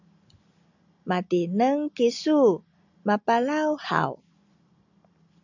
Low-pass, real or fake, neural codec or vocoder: 7.2 kHz; real; none